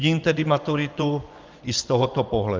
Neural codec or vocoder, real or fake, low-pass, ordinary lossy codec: vocoder, 24 kHz, 100 mel bands, Vocos; fake; 7.2 kHz; Opus, 24 kbps